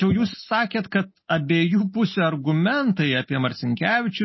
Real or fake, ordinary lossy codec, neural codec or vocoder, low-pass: real; MP3, 24 kbps; none; 7.2 kHz